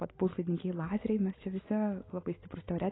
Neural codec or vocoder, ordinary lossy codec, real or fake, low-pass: none; AAC, 16 kbps; real; 7.2 kHz